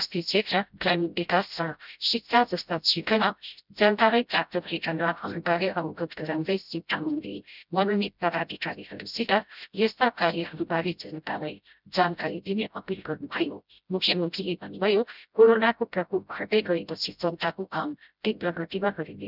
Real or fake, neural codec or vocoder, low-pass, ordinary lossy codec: fake; codec, 16 kHz, 0.5 kbps, FreqCodec, smaller model; 5.4 kHz; none